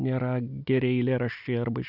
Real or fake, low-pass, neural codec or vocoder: real; 5.4 kHz; none